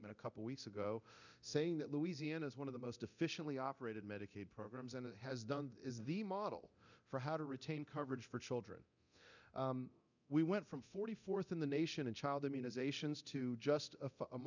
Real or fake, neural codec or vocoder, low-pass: fake; codec, 24 kHz, 0.9 kbps, DualCodec; 7.2 kHz